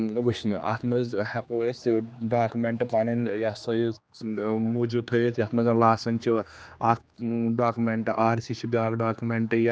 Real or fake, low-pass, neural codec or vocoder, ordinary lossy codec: fake; none; codec, 16 kHz, 2 kbps, X-Codec, HuBERT features, trained on general audio; none